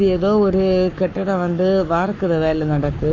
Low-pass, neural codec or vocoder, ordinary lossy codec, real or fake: 7.2 kHz; codec, 44.1 kHz, 7.8 kbps, Pupu-Codec; none; fake